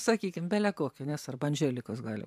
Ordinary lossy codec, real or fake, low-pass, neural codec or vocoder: AAC, 96 kbps; fake; 14.4 kHz; vocoder, 44.1 kHz, 128 mel bands every 512 samples, BigVGAN v2